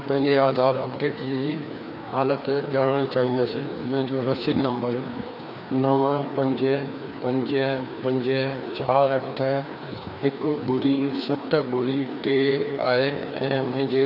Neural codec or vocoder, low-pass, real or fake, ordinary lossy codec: codec, 16 kHz, 2 kbps, FreqCodec, larger model; 5.4 kHz; fake; none